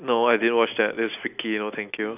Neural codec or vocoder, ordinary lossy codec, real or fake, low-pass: none; none; real; 3.6 kHz